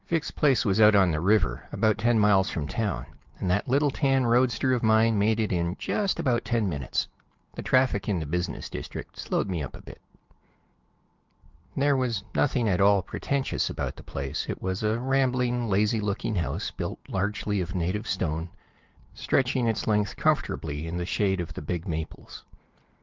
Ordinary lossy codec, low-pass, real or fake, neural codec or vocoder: Opus, 32 kbps; 7.2 kHz; real; none